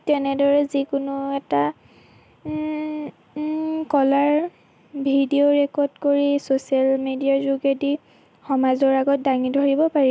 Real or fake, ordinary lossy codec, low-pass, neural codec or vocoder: real; none; none; none